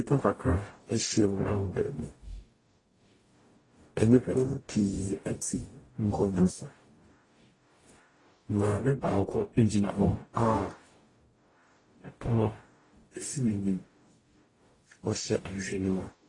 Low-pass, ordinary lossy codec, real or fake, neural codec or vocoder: 10.8 kHz; AAC, 32 kbps; fake; codec, 44.1 kHz, 0.9 kbps, DAC